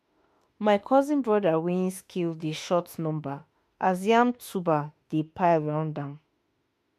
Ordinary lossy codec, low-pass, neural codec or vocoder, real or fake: MP3, 64 kbps; 14.4 kHz; autoencoder, 48 kHz, 32 numbers a frame, DAC-VAE, trained on Japanese speech; fake